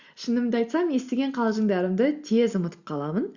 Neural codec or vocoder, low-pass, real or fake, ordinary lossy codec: none; 7.2 kHz; real; Opus, 64 kbps